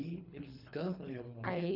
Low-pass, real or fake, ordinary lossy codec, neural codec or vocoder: 5.4 kHz; fake; none; codec, 24 kHz, 3 kbps, HILCodec